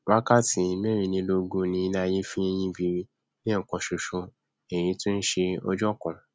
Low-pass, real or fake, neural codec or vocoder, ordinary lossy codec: none; real; none; none